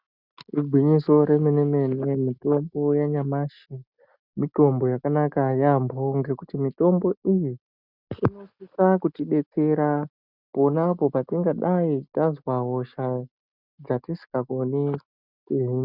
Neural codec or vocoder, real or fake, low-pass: none; real; 5.4 kHz